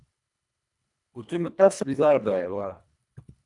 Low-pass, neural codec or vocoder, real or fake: 10.8 kHz; codec, 24 kHz, 1.5 kbps, HILCodec; fake